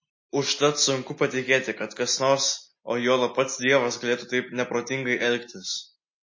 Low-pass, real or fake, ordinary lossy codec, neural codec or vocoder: 7.2 kHz; real; MP3, 32 kbps; none